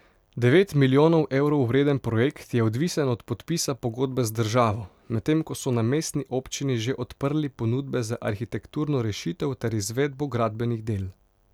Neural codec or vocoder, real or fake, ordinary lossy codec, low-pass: none; real; none; 19.8 kHz